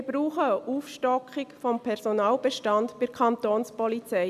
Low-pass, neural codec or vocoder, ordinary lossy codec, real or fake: 14.4 kHz; none; none; real